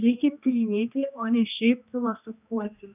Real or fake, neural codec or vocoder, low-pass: fake; codec, 16 kHz, 1 kbps, X-Codec, HuBERT features, trained on general audio; 3.6 kHz